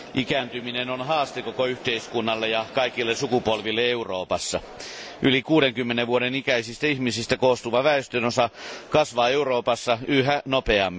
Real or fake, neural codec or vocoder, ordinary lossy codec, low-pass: real; none; none; none